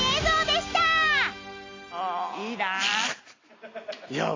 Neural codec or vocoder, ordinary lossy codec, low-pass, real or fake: none; AAC, 32 kbps; 7.2 kHz; real